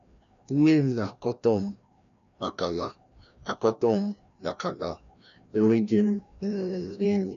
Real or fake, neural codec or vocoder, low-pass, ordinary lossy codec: fake; codec, 16 kHz, 1 kbps, FreqCodec, larger model; 7.2 kHz; none